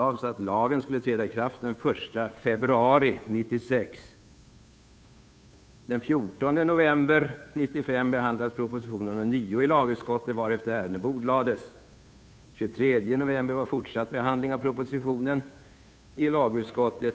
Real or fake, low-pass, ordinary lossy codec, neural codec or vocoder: fake; none; none; codec, 16 kHz, 2 kbps, FunCodec, trained on Chinese and English, 25 frames a second